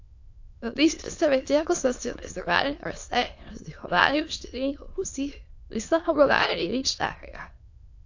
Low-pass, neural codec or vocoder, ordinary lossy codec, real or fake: 7.2 kHz; autoencoder, 22.05 kHz, a latent of 192 numbers a frame, VITS, trained on many speakers; AAC, 48 kbps; fake